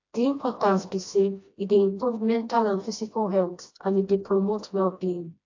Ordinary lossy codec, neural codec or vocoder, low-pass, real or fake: AAC, 48 kbps; codec, 16 kHz, 1 kbps, FreqCodec, smaller model; 7.2 kHz; fake